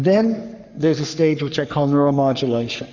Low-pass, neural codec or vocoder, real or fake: 7.2 kHz; codec, 44.1 kHz, 3.4 kbps, Pupu-Codec; fake